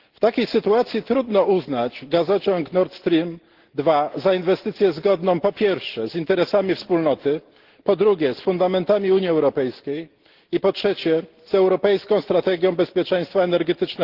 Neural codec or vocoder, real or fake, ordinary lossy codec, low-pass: none; real; Opus, 16 kbps; 5.4 kHz